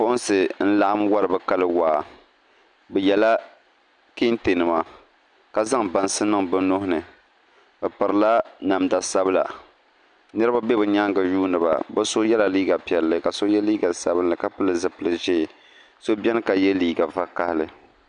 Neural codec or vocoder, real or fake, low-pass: none; real; 9.9 kHz